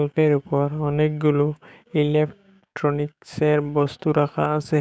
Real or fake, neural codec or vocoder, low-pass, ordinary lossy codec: fake; codec, 16 kHz, 6 kbps, DAC; none; none